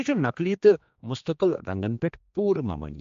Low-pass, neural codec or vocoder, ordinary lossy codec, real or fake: 7.2 kHz; codec, 16 kHz, 2 kbps, X-Codec, HuBERT features, trained on general audio; MP3, 48 kbps; fake